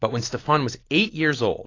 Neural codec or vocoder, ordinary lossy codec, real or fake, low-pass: none; AAC, 32 kbps; real; 7.2 kHz